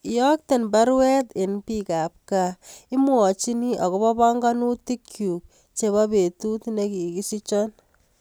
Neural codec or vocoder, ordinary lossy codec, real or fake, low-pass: none; none; real; none